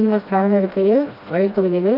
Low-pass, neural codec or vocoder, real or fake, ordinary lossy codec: 5.4 kHz; codec, 16 kHz, 1 kbps, FreqCodec, smaller model; fake; none